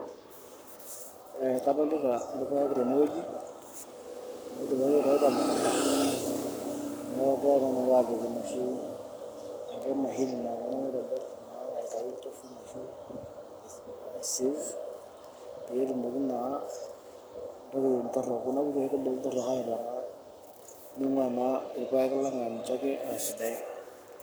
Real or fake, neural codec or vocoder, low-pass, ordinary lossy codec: fake; codec, 44.1 kHz, 7.8 kbps, Pupu-Codec; none; none